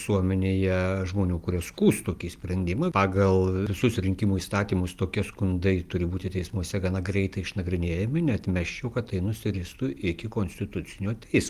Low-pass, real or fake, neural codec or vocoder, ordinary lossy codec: 14.4 kHz; real; none; Opus, 32 kbps